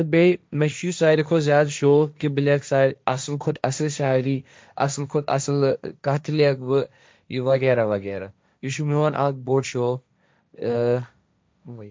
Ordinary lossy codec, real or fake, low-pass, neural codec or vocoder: none; fake; none; codec, 16 kHz, 1.1 kbps, Voila-Tokenizer